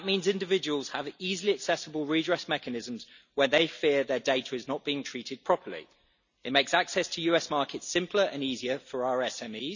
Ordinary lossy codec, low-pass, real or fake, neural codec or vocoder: none; 7.2 kHz; real; none